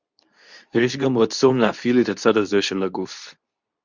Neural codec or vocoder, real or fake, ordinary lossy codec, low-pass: codec, 24 kHz, 0.9 kbps, WavTokenizer, medium speech release version 2; fake; Opus, 64 kbps; 7.2 kHz